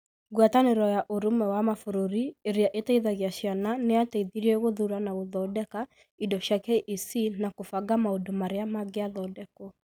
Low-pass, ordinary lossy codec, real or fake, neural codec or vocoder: none; none; real; none